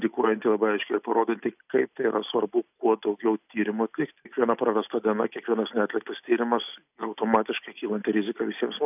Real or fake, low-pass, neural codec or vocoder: real; 3.6 kHz; none